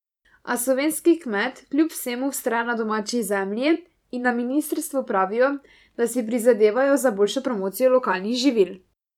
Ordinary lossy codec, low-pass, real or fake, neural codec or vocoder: none; 19.8 kHz; fake; vocoder, 44.1 kHz, 128 mel bands every 512 samples, BigVGAN v2